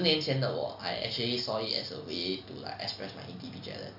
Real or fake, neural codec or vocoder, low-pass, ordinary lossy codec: real; none; 5.4 kHz; none